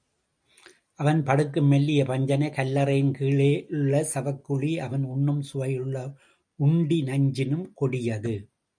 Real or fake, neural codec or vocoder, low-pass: real; none; 9.9 kHz